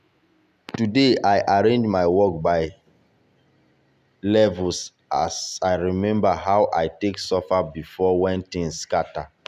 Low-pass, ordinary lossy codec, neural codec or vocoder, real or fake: 14.4 kHz; none; none; real